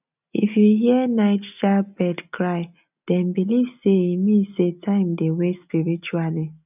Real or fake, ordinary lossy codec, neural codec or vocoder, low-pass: real; none; none; 3.6 kHz